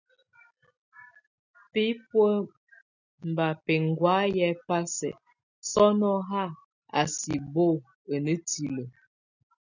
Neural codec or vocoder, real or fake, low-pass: none; real; 7.2 kHz